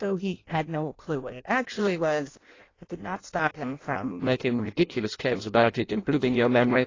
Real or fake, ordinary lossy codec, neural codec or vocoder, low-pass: fake; AAC, 32 kbps; codec, 16 kHz in and 24 kHz out, 0.6 kbps, FireRedTTS-2 codec; 7.2 kHz